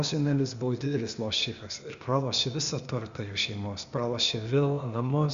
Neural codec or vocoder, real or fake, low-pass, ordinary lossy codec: codec, 16 kHz, 0.8 kbps, ZipCodec; fake; 7.2 kHz; Opus, 64 kbps